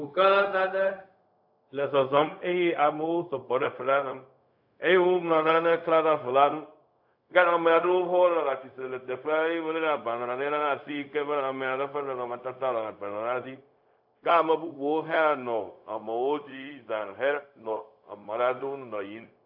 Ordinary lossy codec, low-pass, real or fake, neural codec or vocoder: AAC, 48 kbps; 5.4 kHz; fake; codec, 16 kHz, 0.4 kbps, LongCat-Audio-Codec